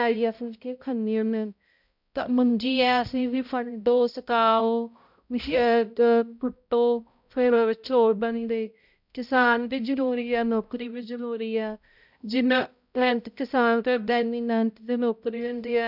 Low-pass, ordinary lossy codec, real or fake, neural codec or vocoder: 5.4 kHz; none; fake; codec, 16 kHz, 0.5 kbps, X-Codec, HuBERT features, trained on balanced general audio